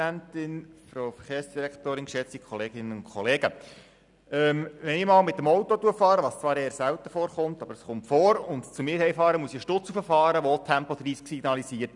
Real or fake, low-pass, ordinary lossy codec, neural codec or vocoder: real; 10.8 kHz; none; none